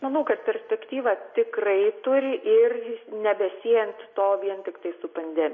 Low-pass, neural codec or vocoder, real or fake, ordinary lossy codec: 7.2 kHz; none; real; MP3, 32 kbps